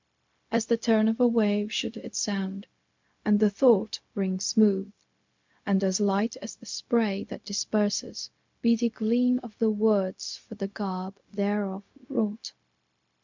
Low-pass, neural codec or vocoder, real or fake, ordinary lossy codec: 7.2 kHz; codec, 16 kHz, 0.4 kbps, LongCat-Audio-Codec; fake; MP3, 64 kbps